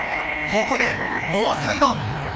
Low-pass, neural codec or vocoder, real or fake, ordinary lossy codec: none; codec, 16 kHz, 1 kbps, FreqCodec, larger model; fake; none